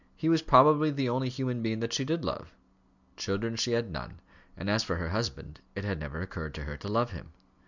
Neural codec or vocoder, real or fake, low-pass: none; real; 7.2 kHz